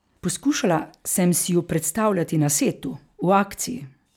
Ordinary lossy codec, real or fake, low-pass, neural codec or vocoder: none; real; none; none